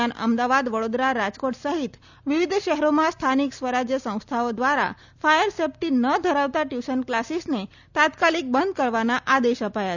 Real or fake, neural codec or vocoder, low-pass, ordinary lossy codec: real; none; 7.2 kHz; none